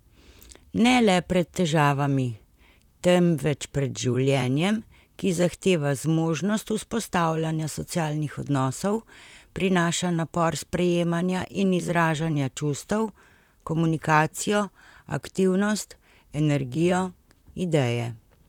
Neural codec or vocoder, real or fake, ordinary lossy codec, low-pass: vocoder, 44.1 kHz, 128 mel bands, Pupu-Vocoder; fake; none; 19.8 kHz